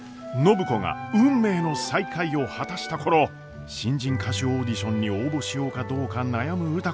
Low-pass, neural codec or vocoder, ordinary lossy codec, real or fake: none; none; none; real